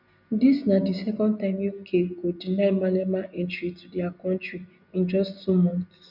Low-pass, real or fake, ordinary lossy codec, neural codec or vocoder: 5.4 kHz; real; none; none